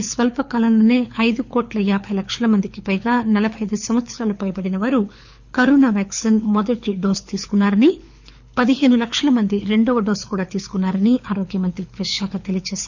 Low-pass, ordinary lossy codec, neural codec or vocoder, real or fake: 7.2 kHz; none; codec, 24 kHz, 6 kbps, HILCodec; fake